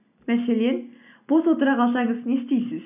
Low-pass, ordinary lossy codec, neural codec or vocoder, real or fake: 3.6 kHz; none; none; real